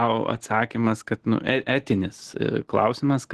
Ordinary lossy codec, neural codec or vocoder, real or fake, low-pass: Opus, 16 kbps; none; real; 10.8 kHz